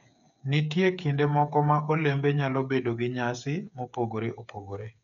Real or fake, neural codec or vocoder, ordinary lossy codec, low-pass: fake; codec, 16 kHz, 8 kbps, FreqCodec, smaller model; none; 7.2 kHz